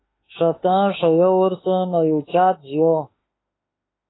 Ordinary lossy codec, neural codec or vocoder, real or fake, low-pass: AAC, 16 kbps; autoencoder, 48 kHz, 32 numbers a frame, DAC-VAE, trained on Japanese speech; fake; 7.2 kHz